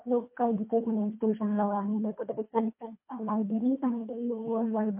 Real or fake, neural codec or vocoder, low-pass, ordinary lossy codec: fake; codec, 24 kHz, 1.5 kbps, HILCodec; 3.6 kHz; MP3, 24 kbps